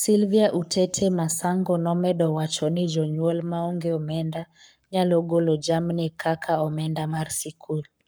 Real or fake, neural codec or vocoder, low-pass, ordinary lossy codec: fake; codec, 44.1 kHz, 7.8 kbps, Pupu-Codec; none; none